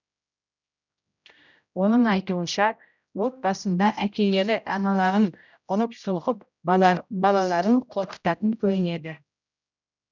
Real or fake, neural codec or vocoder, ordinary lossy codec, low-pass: fake; codec, 16 kHz, 0.5 kbps, X-Codec, HuBERT features, trained on general audio; none; 7.2 kHz